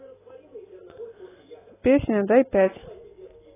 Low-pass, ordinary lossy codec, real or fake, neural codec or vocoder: 3.6 kHz; AAC, 16 kbps; real; none